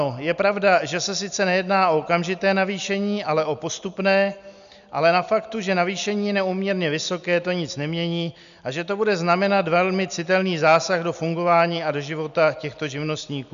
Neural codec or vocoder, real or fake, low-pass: none; real; 7.2 kHz